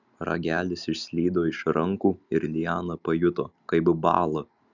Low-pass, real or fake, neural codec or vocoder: 7.2 kHz; real; none